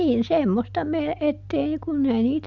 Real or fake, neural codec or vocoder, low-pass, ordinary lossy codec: real; none; 7.2 kHz; none